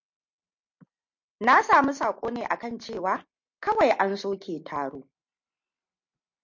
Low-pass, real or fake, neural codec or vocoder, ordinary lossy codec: 7.2 kHz; real; none; MP3, 48 kbps